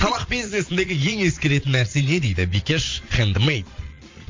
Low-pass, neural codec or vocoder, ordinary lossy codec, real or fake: 7.2 kHz; vocoder, 22.05 kHz, 80 mel bands, Vocos; MP3, 48 kbps; fake